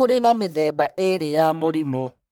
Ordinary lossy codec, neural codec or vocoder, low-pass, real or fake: none; codec, 44.1 kHz, 1.7 kbps, Pupu-Codec; none; fake